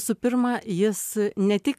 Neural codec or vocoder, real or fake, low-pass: none; real; 14.4 kHz